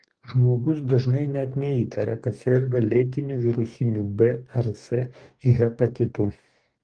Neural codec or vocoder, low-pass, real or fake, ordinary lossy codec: codec, 44.1 kHz, 2.6 kbps, DAC; 9.9 kHz; fake; Opus, 24 kbps